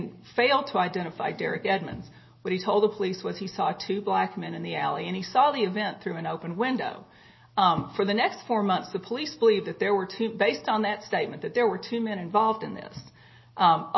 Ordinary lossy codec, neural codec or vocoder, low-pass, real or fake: MP3, 24 kbps; none; 7.2 kHz; real